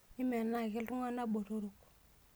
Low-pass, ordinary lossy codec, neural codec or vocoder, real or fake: none; none; none; real